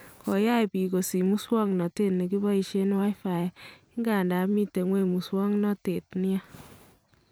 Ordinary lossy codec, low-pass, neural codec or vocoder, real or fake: none; none; vocoder, 44.1 kHz, 128 mel bands every 512 samples, BigVGAN v2; fake